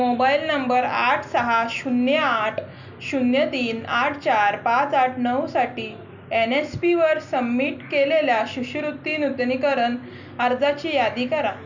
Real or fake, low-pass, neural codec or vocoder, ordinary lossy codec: real; 7.2 kHz; none; none